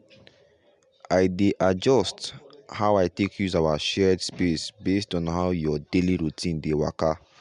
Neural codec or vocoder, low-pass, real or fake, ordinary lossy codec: none; 10.8 kHz; real; MP3, 96 kbps